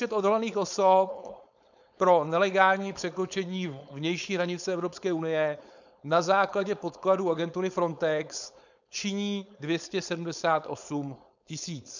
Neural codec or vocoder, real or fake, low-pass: codec, 16 kHz, 4.8 kbps, FACodec; fake; 7.2 kHz